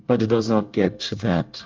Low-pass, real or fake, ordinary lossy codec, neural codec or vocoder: 7.2 kHz; fake; Opus, 24 kbps; codec, 24 kHz, 1 kbps, SNAC